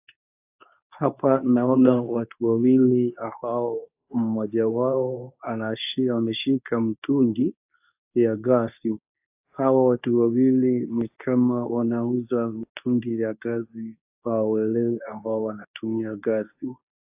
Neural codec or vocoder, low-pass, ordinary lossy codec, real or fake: codec, 24 kHz, 0.9 kbps, WavTokenizer, medium speech release version 2; 3.6 kHz; AAC, 32 kbps; fake